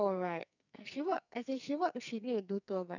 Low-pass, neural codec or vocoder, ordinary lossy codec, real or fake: 7.2 kHz; codec, 44.1 kHz, 2.6 kbps, SNAC; none; fake